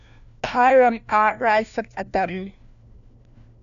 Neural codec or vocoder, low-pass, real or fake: codec, 16 kHz, 1 kbps, FunCodec, trained on LibriTTS, 50 frames a second; 7.2 kHz; fake